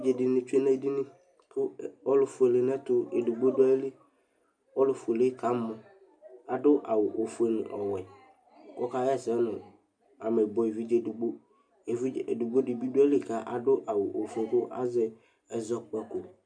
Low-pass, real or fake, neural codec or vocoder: 9.9 kHz; real; none